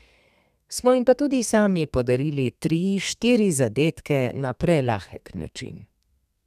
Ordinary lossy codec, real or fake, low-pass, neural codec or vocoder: none; fake; 14.4 kHz; codec, 32 kHz, 1.9 kbps, SNAC